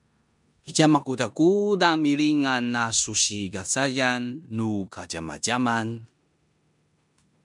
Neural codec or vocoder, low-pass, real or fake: codec, 16 kHz in and 24 kHz out, 0.9 kbps, LongCat-Audio-Codec, four codebook decoder; 10.8 kHz; fake